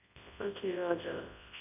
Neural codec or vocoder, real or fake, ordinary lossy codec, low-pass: codec, 24 kHz, 0.9 kbps, WavTokenizer, large speech release; fake; none; 3.6 kHz